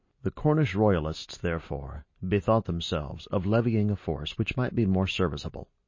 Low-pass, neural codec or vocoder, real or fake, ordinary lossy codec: 7.2 kHz; none; real; MP3, 32 kbps